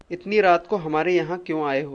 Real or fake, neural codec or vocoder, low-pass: real; none; 9.9 kHz